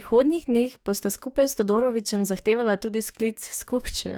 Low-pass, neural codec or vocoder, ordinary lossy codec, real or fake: none; codec, 44.1 kHz, 2.6 kbps, DAC; none; fake